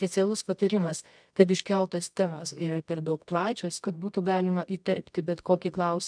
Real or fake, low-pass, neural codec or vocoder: fake; 9.9 kHz; codec, 24 kHz, 0.9 kbps, WavTokenizer, medium music audio release